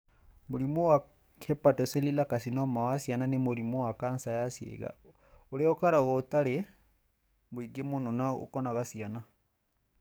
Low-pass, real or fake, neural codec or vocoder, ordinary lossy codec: none; fake; codec, 44.1 kHz, 7.8 kbps, DAC; none